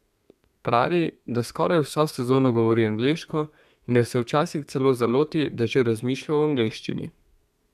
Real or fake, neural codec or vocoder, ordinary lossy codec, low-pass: fake; codec, 32 kHz, 1.9 kbps, SNAC; none; 14.4 kHz